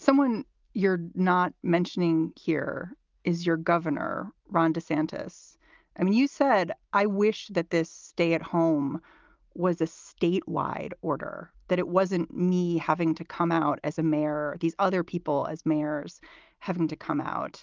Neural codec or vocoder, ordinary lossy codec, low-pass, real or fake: none; Opus, 24 kbps; 7.2 kHz; real